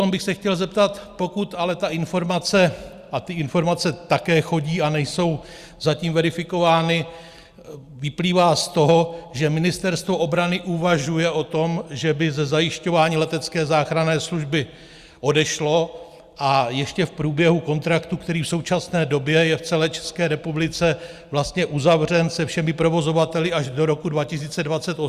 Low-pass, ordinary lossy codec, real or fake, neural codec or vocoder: 14.4 kHz; Opus, 64 kbps; real; none